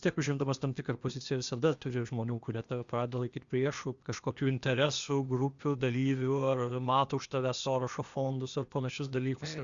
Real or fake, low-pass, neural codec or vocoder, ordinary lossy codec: fake; 7.2 kHz; codec, 16 kHz, 0.8 kbps, ZipCodec; Opus, 64 kbps